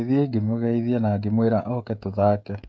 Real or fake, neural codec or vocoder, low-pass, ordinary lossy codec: fake; codec, 16 kHz, 16 kbps, FreqCodec, smaller model; none; none